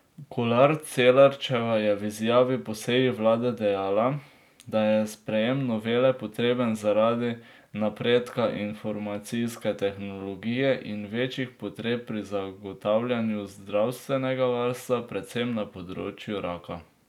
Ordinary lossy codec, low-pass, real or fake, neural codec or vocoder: none; 19.8 kHz; real; none